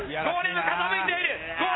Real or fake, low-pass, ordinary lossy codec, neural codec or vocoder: real; 7.2 kHz; AAC, 16 kbps; none